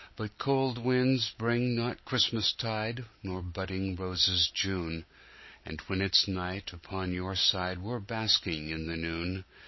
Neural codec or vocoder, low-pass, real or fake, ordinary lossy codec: none; 7.2 kHz; real; MP3, 24 kbps